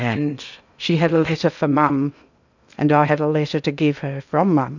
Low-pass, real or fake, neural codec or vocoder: 7.2 kHz; fake; codec, 16 kHz in and 24 kHz out, 0.6 kbps, FocalCodec, streaming, 2048 codes